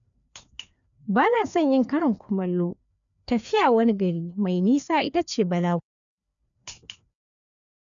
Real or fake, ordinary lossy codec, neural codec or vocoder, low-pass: fake; none; codec, 16 kHz, 2 kbps, FreqCodec, larger model; 7.2 kHz